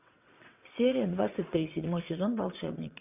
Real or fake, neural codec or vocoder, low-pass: fake; vocoder, 22.05 kHz, 80 mel bands, WaveNeXt; 3.6 kHz